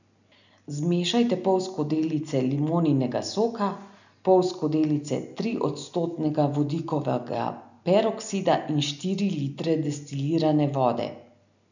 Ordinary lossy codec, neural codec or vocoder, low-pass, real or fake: none; none; 7.2 kHz; real